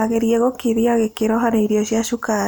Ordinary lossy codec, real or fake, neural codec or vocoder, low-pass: none; real; none; none